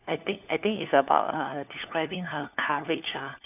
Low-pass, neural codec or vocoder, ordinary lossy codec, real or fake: 3.6 kHz; codec, 16 kHz, 4 kbps, FunCodec, trained on LibriTTS, 50 frames a second; none; fake